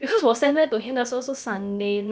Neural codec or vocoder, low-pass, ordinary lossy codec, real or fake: codec, 16 kHz, about 1 kbps, DyCAST, with the encoder's durations; none; none; fake